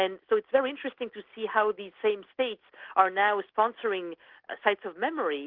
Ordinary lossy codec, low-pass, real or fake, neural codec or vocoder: Opus, 16 kbps; 5.4 kHz; real; none